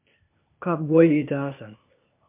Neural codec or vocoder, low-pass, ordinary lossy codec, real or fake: codec, 16 kHz, 0.8 kbps, ZipCodec; 3.6 kHz; MP3, 32 kbps; fake